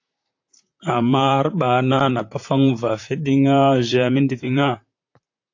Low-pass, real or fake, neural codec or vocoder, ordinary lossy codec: 7.2 kHz; fake; vocoder, 44.1 kHz, 128 mel bands, Pupu-Vocoder; AAC, 48 kbps